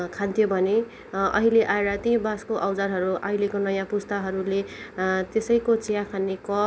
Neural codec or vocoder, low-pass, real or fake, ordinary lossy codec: none; none; real; none